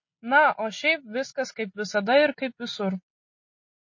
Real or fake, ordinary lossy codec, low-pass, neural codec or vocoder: real; MP3, 32 kbps; 7.2 kHz; none